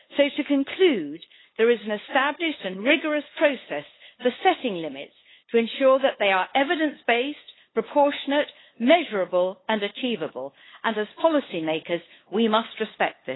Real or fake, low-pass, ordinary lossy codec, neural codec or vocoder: fake; 7.2 kHz; AAC, 16 kbps; codec, 16 kHz, 8 kbps, FunCodec, trained on LibriTTS, 25 frames a second